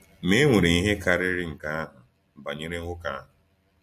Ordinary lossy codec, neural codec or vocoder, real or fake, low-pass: MP3, 64 kbps; none; real; 14.4 kHz